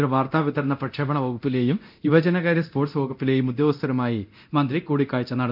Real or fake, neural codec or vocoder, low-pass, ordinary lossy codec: fake; codec, 24 kHz, 0.9 kbps, DualCodec; 5.4 kHz; none